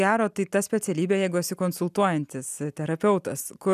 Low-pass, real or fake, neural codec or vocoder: 14.4 kHz; real; none